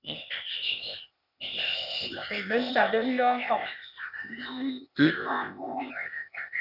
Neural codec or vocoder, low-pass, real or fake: codec, 16 kHz, 0.8 kbps, ZipCodec; 5.4 kHz; fake